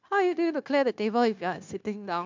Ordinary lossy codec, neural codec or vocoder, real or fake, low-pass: MP3, 64 kbps; codec, 16 kHz, 0.9 kbps, LongCat-Audio-Codec; fake; 7.2 kHz